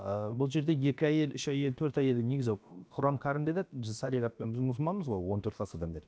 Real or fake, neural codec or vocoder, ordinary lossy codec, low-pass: fake; codec, 16 kHz, about 1 kbps, DyCAST, with the encoder's durations; none; none